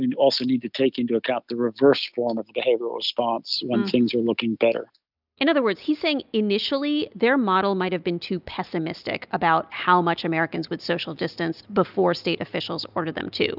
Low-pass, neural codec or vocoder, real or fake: 5.4 kHz; none; real